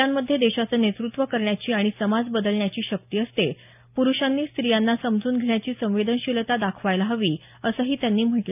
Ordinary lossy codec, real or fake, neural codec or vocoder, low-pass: none; real; none; 3.6 kHz